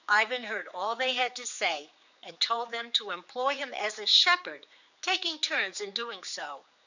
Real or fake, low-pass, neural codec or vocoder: fake; 7.2 kHz; codec, 16 kHz, 4 kbps, X-Codec, HuBERT features, trained on general audio